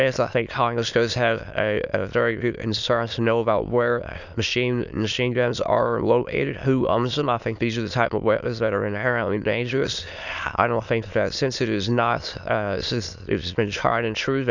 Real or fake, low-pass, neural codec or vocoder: fake; 7.2 kHz; autoencoder, 22.05 kHz, a latent of 192 numbers a frame, VITS, trained on many speakers